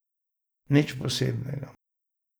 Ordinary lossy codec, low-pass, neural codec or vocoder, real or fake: none; none; none; real